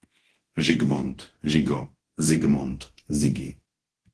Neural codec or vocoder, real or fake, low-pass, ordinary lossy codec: codec, 24 kHz, 0.9 kbps, DualCodec; fake; 10.8 kHz; Opus, 16 kbps